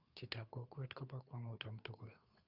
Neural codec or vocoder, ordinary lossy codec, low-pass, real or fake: codec, 16 kHz, 2 kbps, FunCodec, trained on Chinese and English, 25 frames a second; Opus, 64 kbps; 5.4 kHz; fake